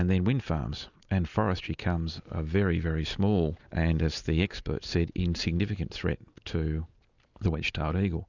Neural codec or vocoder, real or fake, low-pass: none; real; 7.2 kHz